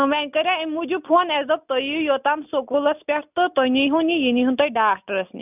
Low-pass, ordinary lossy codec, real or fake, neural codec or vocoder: 3.6 kHz; none; real; none